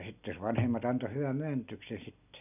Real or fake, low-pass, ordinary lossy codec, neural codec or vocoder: real; 3.6 kHz; none; none